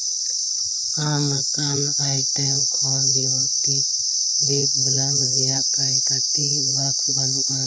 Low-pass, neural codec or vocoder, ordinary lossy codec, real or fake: none; codec, 16 kHz, 4 kbps, FreqCodec, larger model; none; fake